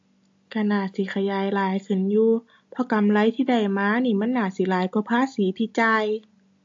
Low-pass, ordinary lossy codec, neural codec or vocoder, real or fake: 7.2 kHz; AAC, 48 kbps; none; real